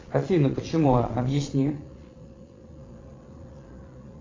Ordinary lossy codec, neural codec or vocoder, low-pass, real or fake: AAC, 32 kbps; vocoder, 22.05 kHz, 80 mel bands, Vocos; 7.2 kHz; fake